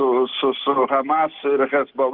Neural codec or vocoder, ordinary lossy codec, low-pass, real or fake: none; Opus, 16 kbps; 5.4 kHz; real